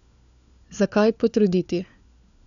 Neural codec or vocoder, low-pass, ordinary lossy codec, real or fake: codec, 16 kHz, 4 kbps, FunCodec, trained on LibriTTS, 50 frames a second; 7.2 kHz; MP3, 96 kbps; fake